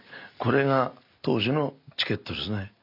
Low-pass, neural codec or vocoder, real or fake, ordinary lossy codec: 5.4 kHz; none; real; MP3, 48 kbps